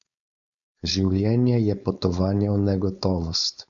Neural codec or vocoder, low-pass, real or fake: codec, 16 kHz, 4.8 kbps, FACodec; 7.2 kHz; fake